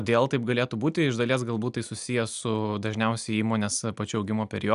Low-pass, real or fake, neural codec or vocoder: 10.8 kHz; real; none